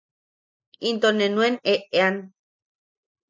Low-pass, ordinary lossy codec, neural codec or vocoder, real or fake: 7.2 kHz; MP3, 64 kbps; none; real